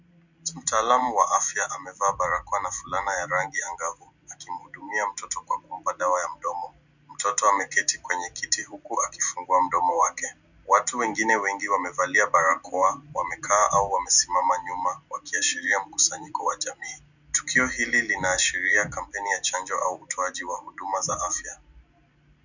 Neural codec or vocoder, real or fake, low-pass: none; real; 7.2 kHz